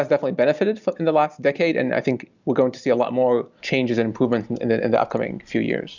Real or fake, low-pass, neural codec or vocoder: real; 7.2 kHz; none